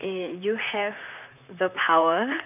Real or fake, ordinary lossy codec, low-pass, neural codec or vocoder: fake; none; 3.6 kHz; vocoder, 44.1 kHz, 128 mel bands, Pupu-Vocoder